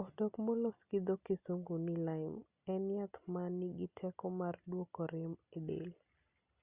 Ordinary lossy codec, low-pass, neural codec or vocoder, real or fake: Opus, 64 kbps; 3.6 kHz; none; real